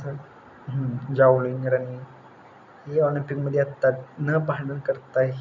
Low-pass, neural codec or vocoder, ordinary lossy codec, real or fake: 7.2 kHz; none; none; real